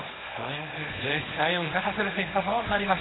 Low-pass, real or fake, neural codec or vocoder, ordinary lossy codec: 7.2 kHz; fake; codec, 24 kHz, 0.9 kbps, WavTokenizer, small release; AAC, 16 kbps